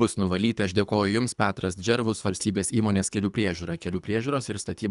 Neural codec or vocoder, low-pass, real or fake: codec, 24 kHz, 3 kbps, HILCodec; 10.8 kHz; fake